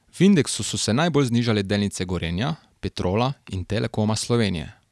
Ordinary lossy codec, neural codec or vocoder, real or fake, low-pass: none; none; real; none